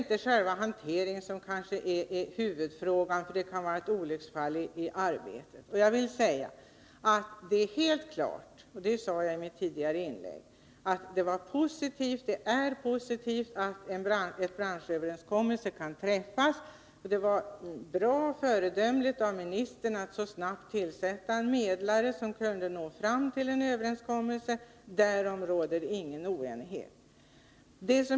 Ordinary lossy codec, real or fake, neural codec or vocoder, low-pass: none; real; none; none